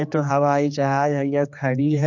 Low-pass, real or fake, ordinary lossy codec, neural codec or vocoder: 7.2 kHz; fake; none; codec, 16 kHz, 2 kbps, X-Codec, HuBERT features, trained on general audio